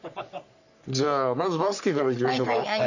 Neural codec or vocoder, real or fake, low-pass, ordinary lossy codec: codec, 44.1 kHz, 3.4 kbps, Pupu-Codec; fake; 7.2 kHz; none